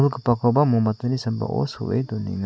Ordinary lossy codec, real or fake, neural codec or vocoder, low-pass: none; real; none; none